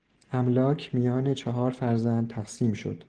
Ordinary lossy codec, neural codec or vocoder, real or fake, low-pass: Opus, 16 kbps; none; real; 9.9 kHz